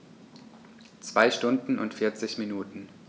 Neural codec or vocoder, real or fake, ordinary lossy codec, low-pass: none; real; none; none